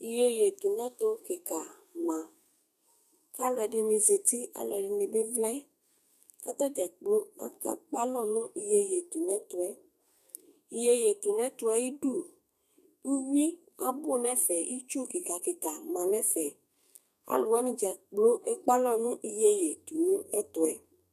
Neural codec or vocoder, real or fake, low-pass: codec, 32 kHz, 1.9 kbps, SNAC; fake; 14.4 kHz